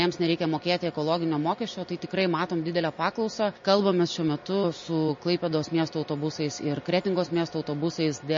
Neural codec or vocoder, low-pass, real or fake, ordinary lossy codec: none; 7.2 kHz; real; MP3, 32 kbps